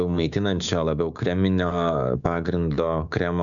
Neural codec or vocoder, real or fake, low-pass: codec, 16 kHz, 6 kbps, DAC; fake; 7.2 kHz